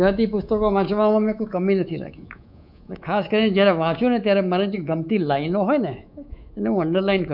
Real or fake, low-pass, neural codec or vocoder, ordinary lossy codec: fake; 5.4 kHz; codec, 24 kHz, 3.1 kbps, DualCodec; none